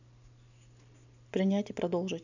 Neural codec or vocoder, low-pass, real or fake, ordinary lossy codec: none; 7.2 kHz; real; none